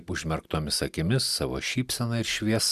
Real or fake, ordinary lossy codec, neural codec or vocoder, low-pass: real; Opus, 64 kbps; none; 14.4 kHz